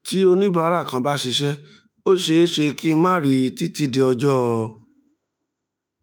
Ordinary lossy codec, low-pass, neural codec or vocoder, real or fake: none; none; autoencoder, 48 kHz, 32 numbers a frame, DAC-VAE, trained on Japanese speech; fake